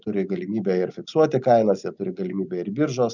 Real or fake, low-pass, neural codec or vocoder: real; 7.2 kHz; none